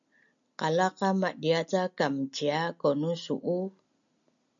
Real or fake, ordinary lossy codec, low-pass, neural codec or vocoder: real; MP3, 64 kbps; 7.2 kHz; none